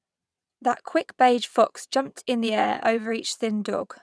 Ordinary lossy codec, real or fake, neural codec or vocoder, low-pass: none; fake; vocoder, 22.05 kHz, 80 mel bands, WaveNeXt; none